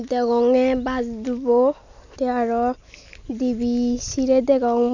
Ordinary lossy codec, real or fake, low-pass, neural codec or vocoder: none; real; 7.2 kHz; none